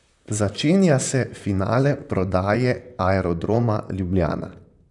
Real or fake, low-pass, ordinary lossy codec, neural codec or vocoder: fake; 10.8 kHz; none; vocoder, 44.1 kHz, 128 mel bands, Pupu-Vocoder